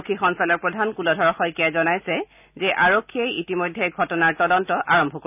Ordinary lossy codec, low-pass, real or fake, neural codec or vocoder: none; 3.6 kHz; real; none